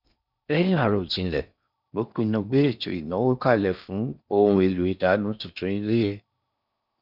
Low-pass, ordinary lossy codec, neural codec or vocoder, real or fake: 5.4 kHz; none; codec, 16 kHz in and 24 kHz out, 0.6 kbps, FocalCodec, streaming, 4096 codes; fake